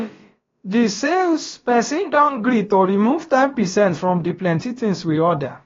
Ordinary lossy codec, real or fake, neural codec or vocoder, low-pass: AAC, 32 kbps; fake; codec, 16 kHz, about 1 kbps, DyCAST, with the encoder's durations; 7.2 kHz